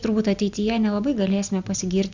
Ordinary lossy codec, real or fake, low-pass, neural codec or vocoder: Opus, 64 kbps; real; 7.2 kHz; none